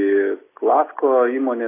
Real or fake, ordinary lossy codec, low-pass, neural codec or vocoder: real; AAC, 24 kbps; 3.6 kHz; none